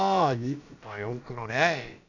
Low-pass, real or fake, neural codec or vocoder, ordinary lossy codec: 7.2 kHz; fake; codec, 16 kHz, about 1 kbps, DyCAST, with the encoder's durations; none